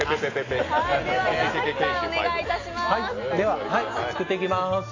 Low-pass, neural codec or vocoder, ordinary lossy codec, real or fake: 7.2 kHz; none; AAC, 32 kbps; real